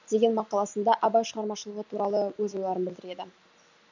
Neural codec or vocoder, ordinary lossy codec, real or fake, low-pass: none; none; real; 7.2 kHz